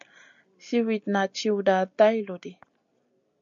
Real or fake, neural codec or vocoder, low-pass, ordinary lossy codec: real; none; 7.2 kHz; MP3, 96 kbps